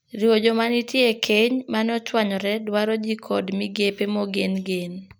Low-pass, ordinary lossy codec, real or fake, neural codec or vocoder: none; none; real; none